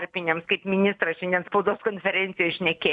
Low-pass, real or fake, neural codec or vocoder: 10.8 kHz; fake; vocoder, 44.1 kHz, 128 mel bands every 256 samples, BigVGAN v2